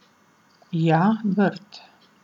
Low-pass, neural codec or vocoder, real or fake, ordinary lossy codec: 19.8 kHz; none; real; none